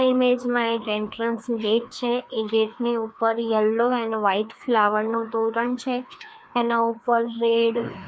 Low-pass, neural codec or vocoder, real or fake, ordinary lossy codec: none; codec, 16 kHz, 2 kbps, FreqCodec, larger model; fake; none